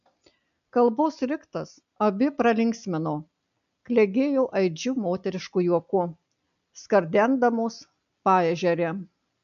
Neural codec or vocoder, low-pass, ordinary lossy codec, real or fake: none; 7.2 kHz; MP3, 96 kbps; real